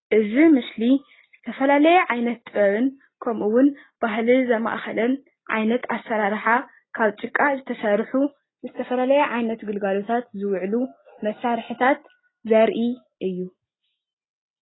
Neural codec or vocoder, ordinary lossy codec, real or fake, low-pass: none; AAC, 16 kbps; real; 7.2 kHz